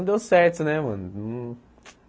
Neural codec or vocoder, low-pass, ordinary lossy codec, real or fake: none; none; none; real